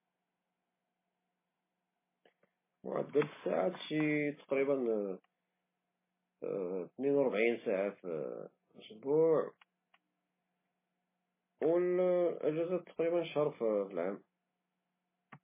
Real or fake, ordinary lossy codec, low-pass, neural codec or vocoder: real; MP3, 16 kbps; 3.6 kHz; none